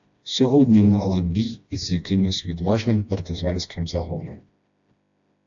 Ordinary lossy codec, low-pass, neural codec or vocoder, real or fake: AAC, 64 kbps; 7.2 kHz; codec, 16 kHz, 1 kbps, FreqCodec, smaller model; fake